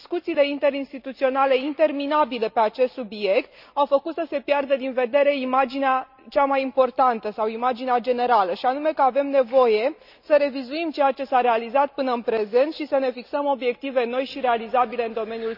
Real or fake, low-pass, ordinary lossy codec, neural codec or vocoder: real; 5.4 kHz; none; none